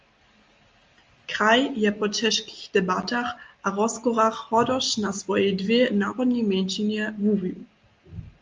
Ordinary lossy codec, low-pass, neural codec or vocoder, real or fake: Opus, 32 kbps; 7.2 kHz; none; real